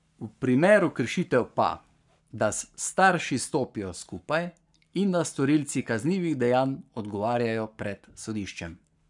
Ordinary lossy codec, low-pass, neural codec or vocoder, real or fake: none; 10.8 kHz; codec, 44.1 kHz, 7.8 kbps, Pupu-Codec; fake